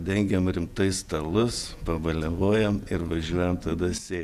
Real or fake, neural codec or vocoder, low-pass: fake; vocoder, 48 kHz, 128 mel bands, Vocos; 14.4 kHz